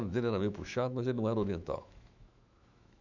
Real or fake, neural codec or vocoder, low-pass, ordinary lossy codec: fake; autoencoder, 48 kHz, 128 numbers a frame, DAC-VAE, trained on Japanese speech; 7.2 kHz; none